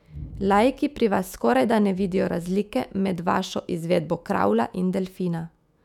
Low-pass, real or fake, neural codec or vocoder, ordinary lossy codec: 19.8 kHz; fake; autoencoder, 48 kHz, 128 numbers a frame, DAC-VAE, trained on Japanese speech; none